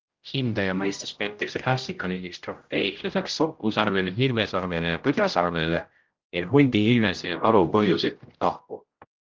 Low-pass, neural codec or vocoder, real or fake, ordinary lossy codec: 7.2 kHz; codec, 16 kHz, 0.5 kbps, X-Codec, HuBERT features, trained on general audio; fake; Opus, 32 kbps